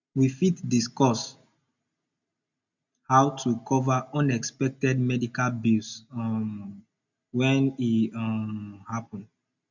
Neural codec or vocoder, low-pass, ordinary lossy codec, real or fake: none; 7.2 kHz; none; real